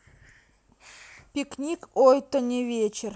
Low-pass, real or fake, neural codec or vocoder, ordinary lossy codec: none; real; none; none